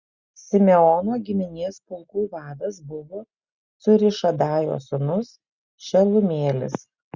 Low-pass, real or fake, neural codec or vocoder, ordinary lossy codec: 7.2 kHz; real; none; Opus, 64 kbps